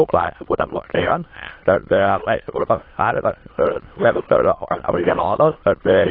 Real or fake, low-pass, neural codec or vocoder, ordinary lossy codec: fake; 5.4 kHz; autoencoder, 22.05 kHz, a latent of 192 numbers a frame, VITS, trained on many speakers; AAC, 24 kbps